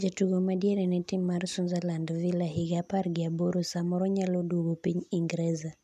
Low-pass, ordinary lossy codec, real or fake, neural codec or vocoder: 14.4 kHz; none; real; none